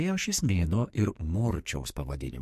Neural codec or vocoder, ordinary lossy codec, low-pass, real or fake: codec, 44.1 kHz, 2.6 kbps, SNAC; MP3, 64 kbps; 14.4 kHz; fake